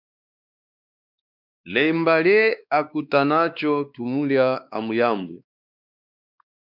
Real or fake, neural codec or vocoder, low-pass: fake; codec, 16 kHz, 2 kbps, X-Codec, WavLM features, trained on Multilingual LibriSpeech; 5.4 kHz